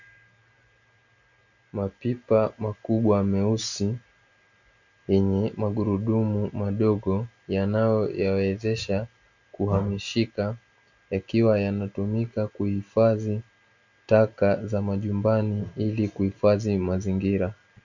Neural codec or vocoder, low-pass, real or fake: none; 7.2 kHz; real